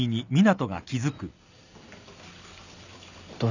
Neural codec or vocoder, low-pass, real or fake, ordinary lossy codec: none; 7.2 kHz; real; none